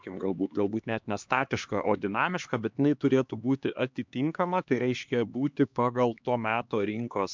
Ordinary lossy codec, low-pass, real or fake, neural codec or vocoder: MP3, 64 kbps; 7.2 kHz; fake; codec, 16 kHz, 2 kbps, X-Codec, HuBERT features, trained on LibriSpeech